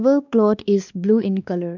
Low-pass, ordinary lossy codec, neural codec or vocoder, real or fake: 7.2 kHz; none; codec, 16 kHz, 2 kbps, X-Codec, WavLM features, trained on Multilingual LibriSpeech; fake